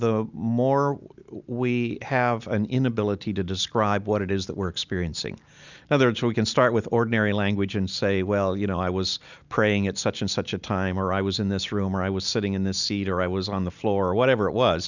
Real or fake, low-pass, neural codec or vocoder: real; 7.2 kHz; none